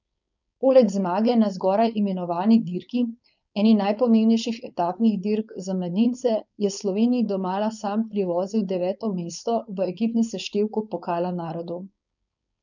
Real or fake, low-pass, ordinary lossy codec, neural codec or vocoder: fake; 7.2 kHz; none; codec, 16 kHz, 4.8 kbps, FACodec